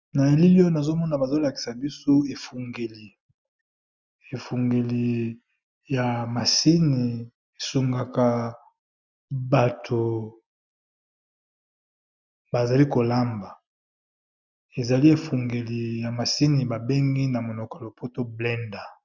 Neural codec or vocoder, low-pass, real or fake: none; 7.2 kHz; real